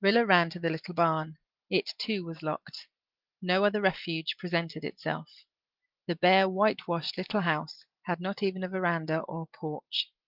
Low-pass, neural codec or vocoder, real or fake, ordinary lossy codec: 5.4 kHz; none; real; Opus, 32 kbps